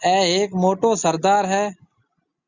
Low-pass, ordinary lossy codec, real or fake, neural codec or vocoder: 7.2 kHz; Opus, 64 kbps; real; none